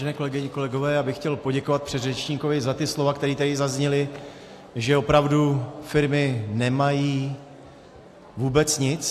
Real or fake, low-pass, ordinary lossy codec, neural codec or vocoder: real; 14.4 kHz; AAC, 64 kbps; none